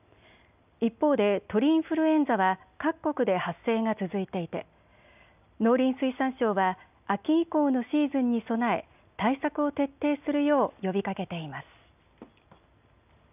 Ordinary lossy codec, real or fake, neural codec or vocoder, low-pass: none; real; none; 3.6 kHz